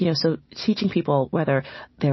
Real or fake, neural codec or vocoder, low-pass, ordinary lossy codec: real; none; 7.2 kHz; MP3, 24 kbps